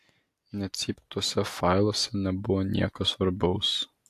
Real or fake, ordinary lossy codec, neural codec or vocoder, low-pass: real; AAC, 64 kbps; none; 14.4 kHz